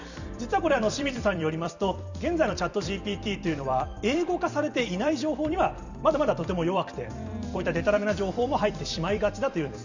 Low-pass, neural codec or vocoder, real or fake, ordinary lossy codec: 7.2 kHz; vocoder, 44.1 kHz, 128 mel bands every 256 samples, BigVGAN v2; fake; none